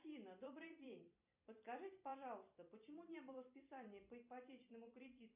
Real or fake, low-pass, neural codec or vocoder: fake; 3.6 kHz; vocoder, 44.1 kHz, 128 mel bands every 256 samples, BigVGAN v2